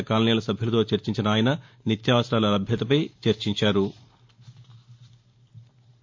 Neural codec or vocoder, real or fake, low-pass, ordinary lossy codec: none; real; 7.2 kHz; MP3, 48 kbps